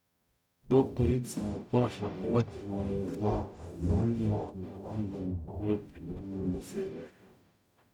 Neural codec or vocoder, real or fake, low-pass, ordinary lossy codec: codec, 44.1 kHz, 0.9 kbps, DAC; fake; 19.8 kHz; none